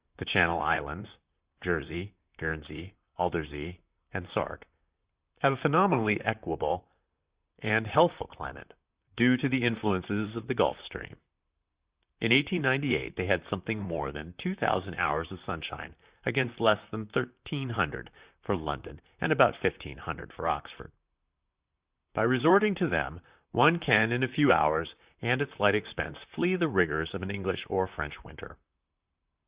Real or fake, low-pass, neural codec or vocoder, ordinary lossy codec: fake; 3.6 kHz; vocoder, 44.1 kHz, 128 mel bands, Pupu-Vocoder; Opus, 64 kbps